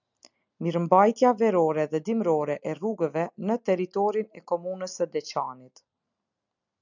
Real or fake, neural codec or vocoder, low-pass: real; none; 7.2 kHz